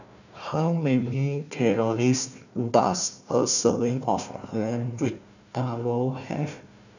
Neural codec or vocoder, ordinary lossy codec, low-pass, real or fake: codec, 16 kHz, 1 kbps, FunCodec, trained on Chinese and English, 50 frames a second; none; 7.2 kHz; fake